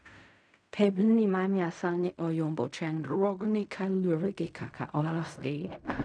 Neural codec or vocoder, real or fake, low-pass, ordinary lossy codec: codec, 16 kHz in and 24 kHz out, 0.4 kbps, LongCat-Audio-Codec, fine tuned four codebook decoder; fake; 9.9 kHz; none